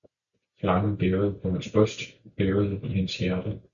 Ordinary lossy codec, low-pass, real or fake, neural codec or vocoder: MP3, 96 kbps; 7.2 kHz; real; none